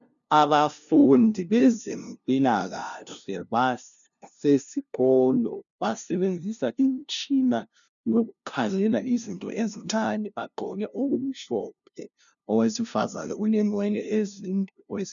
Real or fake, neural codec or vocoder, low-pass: fake; codec, 16 kHz, 0.5 kbps, FunCodec, trained on LibriTTS, 25 frames a second; 7.2 kHz